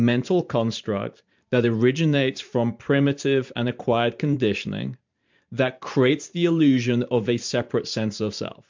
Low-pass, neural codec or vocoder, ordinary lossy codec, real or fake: 7.2 kHz; vocoder, 44.1 kHz, 128 mel bands every 512 samples, BigVGAN v2; MP3, 64 kbps; fake